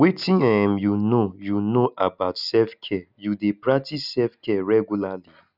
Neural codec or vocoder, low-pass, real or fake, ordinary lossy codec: none; 5.4 kHz; real; none